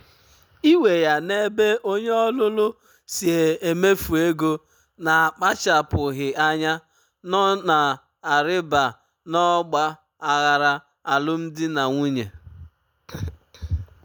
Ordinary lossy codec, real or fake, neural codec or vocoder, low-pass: none; real; none; none